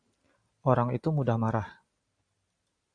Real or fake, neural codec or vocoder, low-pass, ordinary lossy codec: real; none; 9.9 kHz; Opus, 64 kbps